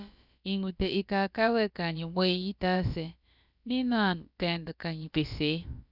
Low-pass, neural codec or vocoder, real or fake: 5.4 kHz; codec, 16 kHz, about 1 kbps, DyCAST, with the encoder's durations; fake